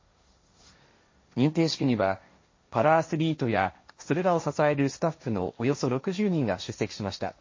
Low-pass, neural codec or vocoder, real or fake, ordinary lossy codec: 7.2 kHz; codec, 16 kHz, 1.1 kbps, Voila-Tokenizer; fake; MP3, 32 kbps